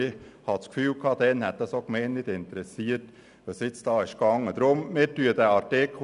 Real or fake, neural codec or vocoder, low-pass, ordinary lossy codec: real; none; 10.8 kHz; none